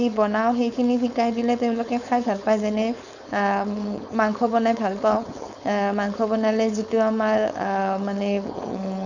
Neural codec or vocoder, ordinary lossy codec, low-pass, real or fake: codec, 16 kHz, 4.8 kbps, FACodec; none; 7.2 kHz; fake